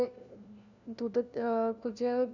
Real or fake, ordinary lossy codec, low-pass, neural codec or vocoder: fake; none; 7.2 kHz; codec, 16 kHz, 1 kbps, FunCodec, trained on LibriTTS, 50 frames a second